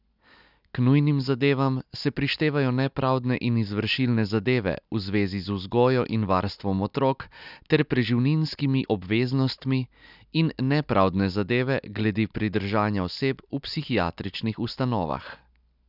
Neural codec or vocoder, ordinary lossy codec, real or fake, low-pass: none; none; real; 5.4 kHz